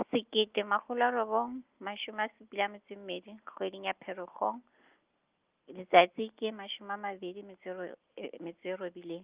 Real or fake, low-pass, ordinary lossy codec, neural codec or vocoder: real; 3.6 kHz; Opus, 32 kbps; none